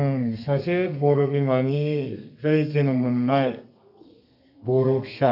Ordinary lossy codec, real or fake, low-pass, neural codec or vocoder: none; fake; 5.4 kHz; codec, 32 kHz, 1.9 kbps, SNAC